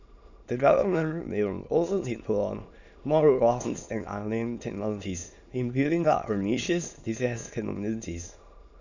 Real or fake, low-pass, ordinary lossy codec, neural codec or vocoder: fake; 7.2 kHz; none; autoencoder, 22.05 kHz, a latent of 192 numbers a frame, VITS, trained on many speakers